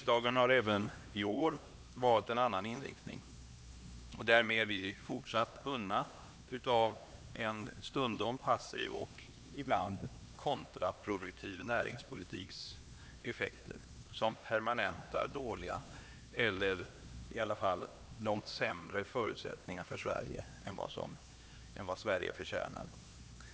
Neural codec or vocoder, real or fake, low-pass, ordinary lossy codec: codec, 16 kHz, 2 kbps, X-Codec, HuBERT features, trained on LibriSpeech; fake; none; none